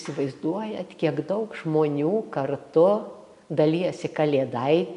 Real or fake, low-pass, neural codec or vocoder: real; 10.8 kHz; none